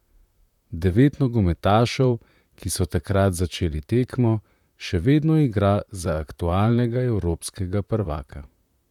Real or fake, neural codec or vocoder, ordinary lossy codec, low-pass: fake; vocoder, 44.1 kHz, 128 mel bands, Pupu-Vocoder; none; 19.8 kHz